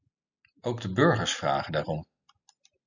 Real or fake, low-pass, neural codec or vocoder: real; 7.2 kHz; none